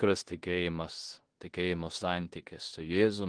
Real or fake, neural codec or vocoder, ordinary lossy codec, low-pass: fake; codec, 16 kHz in and 24 kHz out, 0.9 kbps, LongCat-Audio-Codec, four codebook decoder; Opus, 16 kbps; 9.9 kHz